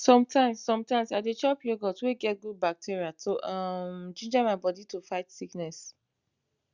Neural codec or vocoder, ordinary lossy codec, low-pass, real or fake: none; Opus, 64 kbps; 7.2 kHz; real